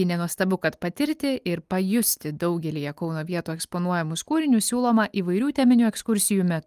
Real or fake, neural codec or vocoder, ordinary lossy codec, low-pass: real; none; Opus, 32 kbps; 14.4 kHz